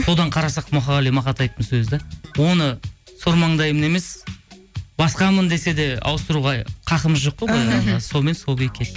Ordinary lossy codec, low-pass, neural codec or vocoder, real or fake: none; none; none; real